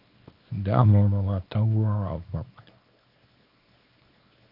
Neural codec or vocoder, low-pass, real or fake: codec, 24 kHz, 0.9 kbps, WavTokenizer, small release; 5.4 kHz; fake